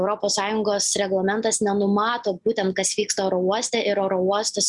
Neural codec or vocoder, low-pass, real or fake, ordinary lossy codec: none; 10.8 kHz; real; Opus, 64 kbps